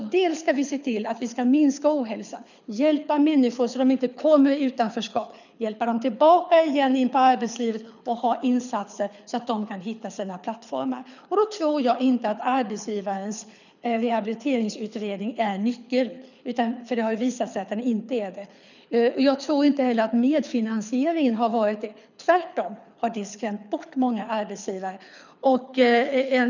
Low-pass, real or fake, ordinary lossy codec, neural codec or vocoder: 7.2 kHz; fake; none; codec, 24 kHz, 6 kbps, HILCodec